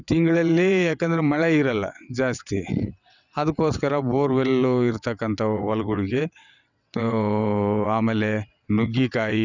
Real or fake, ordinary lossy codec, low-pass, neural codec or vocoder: fake; none; 7.2 kHz; vocoder, 22.05 kHz, 80 mel bands, WaveNeXt